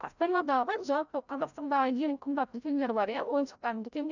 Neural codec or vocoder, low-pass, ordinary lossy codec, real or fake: codec, 16 kHz, 0.5 kbps, FreqCodec, larger model; 7.2 kHz; none; fake